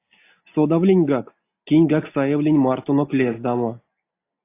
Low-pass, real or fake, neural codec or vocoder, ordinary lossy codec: 3.6 kHz; real; none; AAC, 24 kbps